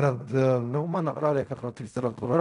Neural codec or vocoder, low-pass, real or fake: codec, 16 kHz in and 24 kHz out, 0.4 kbps, LongCat-Audio-Codec, fine tuned four codebook decoder; 10.8 kHz; fake